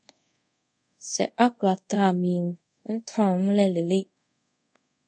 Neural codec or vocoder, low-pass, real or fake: codec, 24 kHz, 0.5 kbps, DualCodec; 9.9 kHz; fake